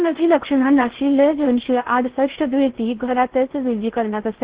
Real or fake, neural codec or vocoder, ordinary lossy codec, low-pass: fake; codec, 16 kHz in and 24 kHz out, 0.6 kbps, FocalCodec, streaming, 4096 codes; Opus, 16 kbps; 3.6 kHz